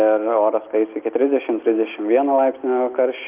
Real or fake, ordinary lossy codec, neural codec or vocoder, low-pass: real; Opus, 24 kbps; none; 3.6 kHz